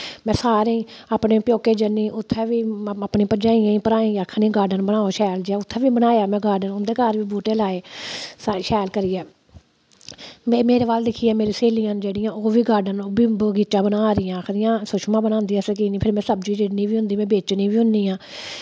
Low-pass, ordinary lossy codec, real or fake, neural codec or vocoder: none; none; real; none